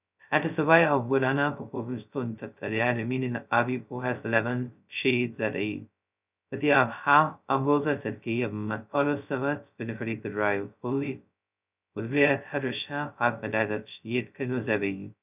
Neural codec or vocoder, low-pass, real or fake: codec, 16 kHz, 0.2 kbps, FocalCodec; 3.6 kHz; fake